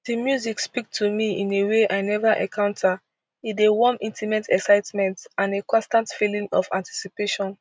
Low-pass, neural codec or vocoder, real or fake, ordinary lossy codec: none; none; real; none